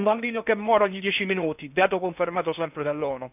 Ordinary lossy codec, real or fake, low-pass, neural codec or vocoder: none; fake; 3.6 kHz; codec, 16 kHz in and 24 kHz out, 0.6 kbps, FocalCodec, streaming, 2048 codes